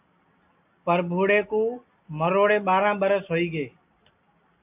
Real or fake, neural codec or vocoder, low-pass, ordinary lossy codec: real; none; 3.6 kHz; AAC, 32 kbps